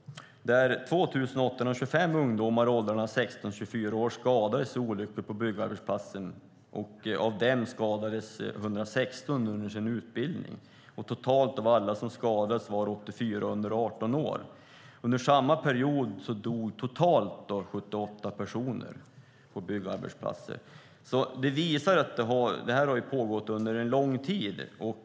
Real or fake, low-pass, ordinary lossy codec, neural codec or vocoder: real; none; none; none